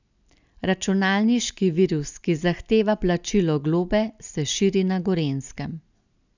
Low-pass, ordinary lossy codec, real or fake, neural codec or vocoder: 7.2 kHz; none; real; none